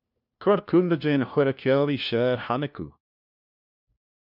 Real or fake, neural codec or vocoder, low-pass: fake; codec, 16 kHz, 1 kbps, FunCodec, trained on LibriTTS, 50 frames a second; 5.4 kHz